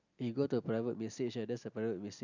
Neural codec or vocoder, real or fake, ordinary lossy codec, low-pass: none; real; none; 7.2 kHz